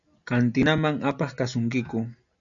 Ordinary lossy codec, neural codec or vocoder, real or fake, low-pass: MP3, 96 kbps; none; real; 7.2 kHz